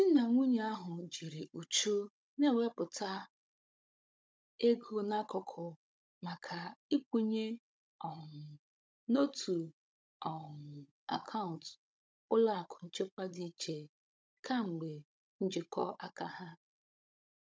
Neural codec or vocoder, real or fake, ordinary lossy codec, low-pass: codec, 16 kHz, 16 kbps, FunCodec, trained on Chinese and English, 50 frames a second; fake; none; none